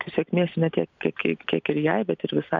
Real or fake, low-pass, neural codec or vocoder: real; 7.2 kHz; none